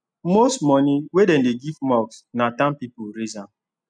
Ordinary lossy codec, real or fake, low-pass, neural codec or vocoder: none; real; 9.9 kHz; none